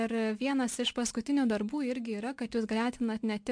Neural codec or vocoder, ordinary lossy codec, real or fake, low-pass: none; MP3, 64 kbps; real; 9.9 kHz